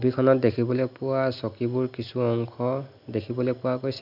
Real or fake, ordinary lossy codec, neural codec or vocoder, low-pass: real; none; none; 5.4 kHz